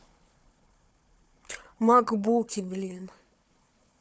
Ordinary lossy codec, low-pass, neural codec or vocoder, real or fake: none; none; codec, 16 kHz, 4 kbps, FunCodec, trained on Chinese and English, 50 frames a second; fake